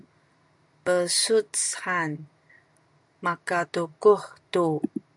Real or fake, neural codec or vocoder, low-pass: real; none; 10.8 kHz